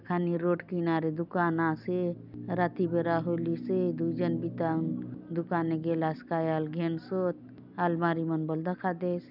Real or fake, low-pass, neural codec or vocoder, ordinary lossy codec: real; 5.4 kHz; none; none